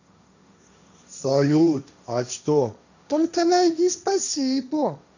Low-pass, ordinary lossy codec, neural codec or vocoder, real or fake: 7.2 kHz; none; codec, 16 kHz, 1.1 kbps, Voila-Tokenizer; fake